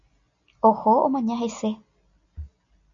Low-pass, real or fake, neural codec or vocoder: 7.2 kHz; real; none